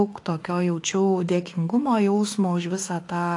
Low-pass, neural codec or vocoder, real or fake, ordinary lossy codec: 10.8 kHz; autoencoder, 48 kHz, 32 numbers a frame, DAC-VAE, trained on Japanese speech; fake; AAC, 48 kbps